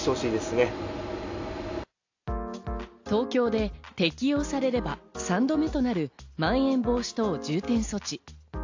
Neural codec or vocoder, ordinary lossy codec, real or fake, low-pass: none; AAC, 48 kbps; real; 7.2 kHz